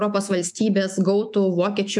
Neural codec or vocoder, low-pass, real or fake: autoencoder, 48 kHz, 128 numbers a frame, DAC-VAE, trained on Japanese speech; 10.8 kHz; fake